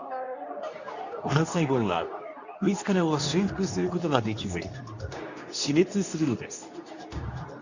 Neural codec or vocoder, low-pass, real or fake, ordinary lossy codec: codec, 24 kHz, 0.9 kbps, WavTokenizer, medium speech release version 2; 7.2 kHz; fake; none